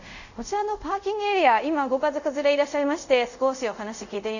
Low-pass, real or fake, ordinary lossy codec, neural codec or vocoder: 7.2 kHz; fake; none; codec, 24 kHz, 0.5 kbps, DualCodec